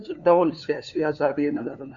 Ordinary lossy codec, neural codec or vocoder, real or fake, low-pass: MP3, 64 kbps; codec, 16 kHz, 2 kbps, FunCodec, trained on LibriTTS, 25 frames a second; fake; 7.2 kHz